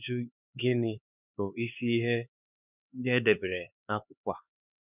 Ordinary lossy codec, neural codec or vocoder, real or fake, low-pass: none; codec, 16 kHz, 4 kbps, X-Codec, HuBERT features, trained on LibriSpeech; fake; 3.6 kHz